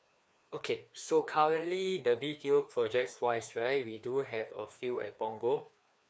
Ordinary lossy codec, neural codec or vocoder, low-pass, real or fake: none; codec, 16 kHz, 2 kbps, FreqCodec, larger model; none; fake